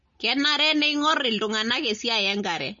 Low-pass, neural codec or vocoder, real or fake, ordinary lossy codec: 7.2 kHz; none; real; MP3, 32 kbps